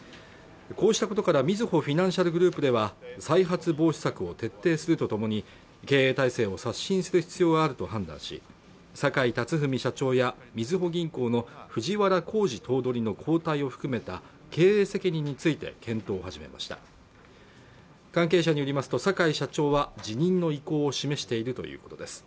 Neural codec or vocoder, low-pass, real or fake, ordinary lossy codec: none; none; real; none